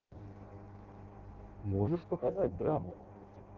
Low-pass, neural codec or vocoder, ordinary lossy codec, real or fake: 7.2 kHz; codec, 16 kHz in and 24 kHz out, 0.6 kbps, FireRedTTS-2 codec; Opus, 16 kbps; fake